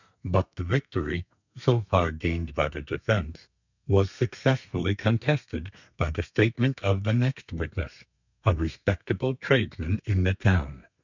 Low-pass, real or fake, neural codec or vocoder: 7.2 kHz; fake; codec, 32 kHz, 1.9 kbps, SNAC